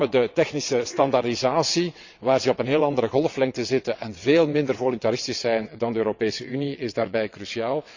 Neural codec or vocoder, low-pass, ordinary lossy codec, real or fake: vocoder, 22.05 kHz, 80 mel bands, WaveNeXt; 7.2 kHz; Opus, 64 kbps; fake